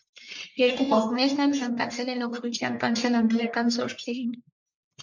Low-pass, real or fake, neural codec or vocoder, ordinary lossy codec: 7.2 kHz; fake; codec, 44.1 kHz, 1.7 kbps, Pupu-Codec; MP3, 48 kbps